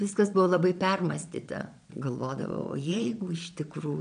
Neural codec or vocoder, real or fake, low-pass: vocoder, 22.05 kHz, 80 mel bands, Vocos; fake; 9.9 kHz